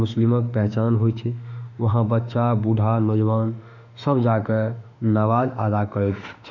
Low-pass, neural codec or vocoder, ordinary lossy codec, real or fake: 7.2 kHz; autoencoder, 48 kHz, 32 numbers a frame, DAC-VAE, trained on Japanese speech; none; fake